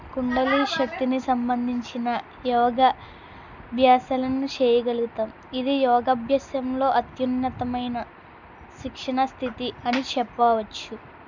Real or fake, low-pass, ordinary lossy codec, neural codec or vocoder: real; 7.2 kHz; none; none